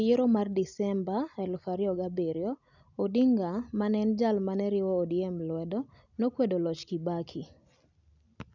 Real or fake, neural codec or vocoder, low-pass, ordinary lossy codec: real; none; 7.2 kHz; none